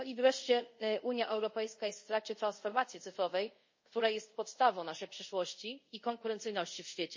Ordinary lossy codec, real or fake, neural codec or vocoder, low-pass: MP3, 32 kbps; fake; codec, 24 kHz, 0.5 kbps, DualCodec; 7.2 kHz